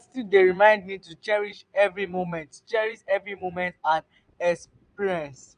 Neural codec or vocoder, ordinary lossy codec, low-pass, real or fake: vocoder, 22.05 kHz, 80 mel bands, Vocos; none; 9.9 kHz; fake